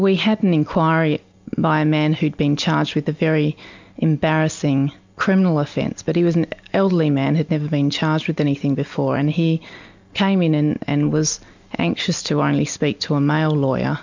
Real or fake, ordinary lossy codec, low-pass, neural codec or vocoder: real; MP3, 64 kbps; 7.2 kHz; none